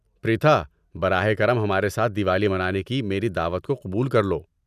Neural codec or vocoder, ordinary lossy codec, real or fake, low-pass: none; none; real; 14.4 kHz